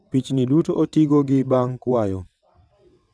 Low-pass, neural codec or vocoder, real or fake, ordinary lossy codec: none; vocoder, 22.05 kHz, 80 mel bands, WaveNeXt; fake; none